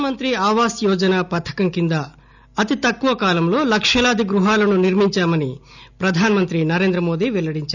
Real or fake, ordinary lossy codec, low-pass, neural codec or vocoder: real; none; 7.2 kHz; none